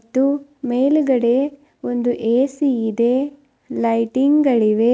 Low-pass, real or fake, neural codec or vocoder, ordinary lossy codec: none; real; none; none